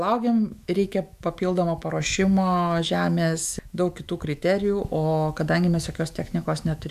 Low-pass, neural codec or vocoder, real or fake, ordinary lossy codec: 14.4 kHz; autoencoder, 48 kHz, 128 numbers a frame, DAC-VAE, trained on Japanese speech; fake; MP3, 96 kbps